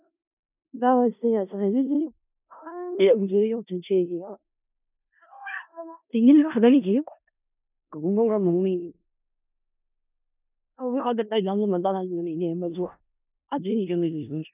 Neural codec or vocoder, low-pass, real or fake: codec, 16 kHz in and 24 kHz out, 0.4 kbps, LongCat-Audio-Codec, four codebook decoder; 3.6 kHz; fake